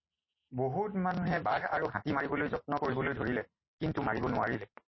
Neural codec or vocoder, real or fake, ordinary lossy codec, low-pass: none; real; MP3, 24 kbps; 7.2 kHz